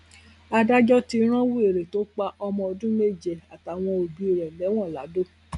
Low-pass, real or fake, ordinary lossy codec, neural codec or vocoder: 10.8 kHz; real; none; none